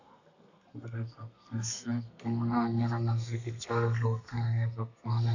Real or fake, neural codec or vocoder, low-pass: fake; codec, 32 kHz, 1.9 kbps, SNAC; 7.2 kHz